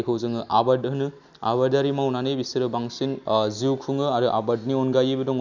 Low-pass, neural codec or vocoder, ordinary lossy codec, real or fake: 7.2 kHz; none; none; real